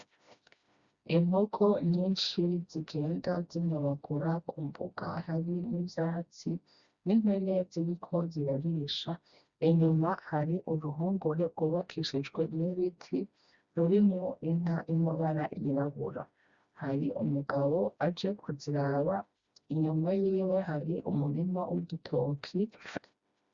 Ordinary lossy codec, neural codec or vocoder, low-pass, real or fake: Opus, 64 kbps; codec, 16 kHz, 1 kbps, FreqCodec, smaller model; 7.2 kHz; fake